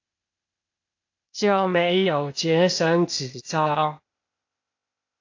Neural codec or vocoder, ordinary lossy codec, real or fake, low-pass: codec, 16 kHz, 0.8 kbps, ZipCodec; AAC, 48 kbps; fake; 7.2 kHz